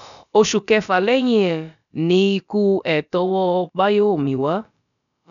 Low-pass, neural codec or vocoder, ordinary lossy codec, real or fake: 7.2 kHz; codec, 16 kHz, about 1 kbps, DyCAST, with the encoder's durations; none; fake